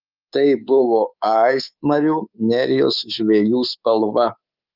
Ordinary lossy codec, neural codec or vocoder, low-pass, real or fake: Opus, 24 kbps; codec, 24 kHz, 3.1 kbps, DualCodec; 5.4 kHz; fake